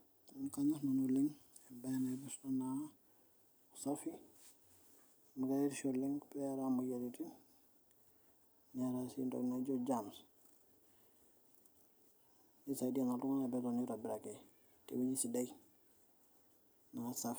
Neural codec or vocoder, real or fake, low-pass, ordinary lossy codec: vocoder, 44.1 kHz, 128 mel bands every 256 samples, BigVGAN v2; fake; none; none